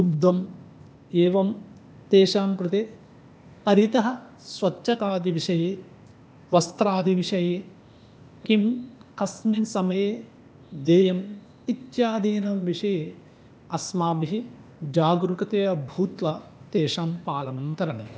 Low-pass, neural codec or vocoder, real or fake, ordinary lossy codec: none; codec, 16 kHz, 0.8 kbps, ZipCodec; fake; none